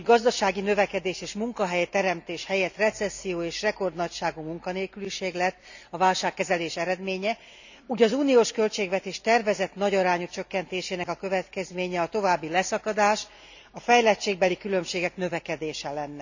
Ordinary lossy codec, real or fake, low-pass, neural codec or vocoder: none; real; 7.2 kHz; none